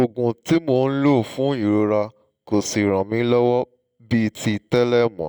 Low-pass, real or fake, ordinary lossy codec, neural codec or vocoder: none; real; none; none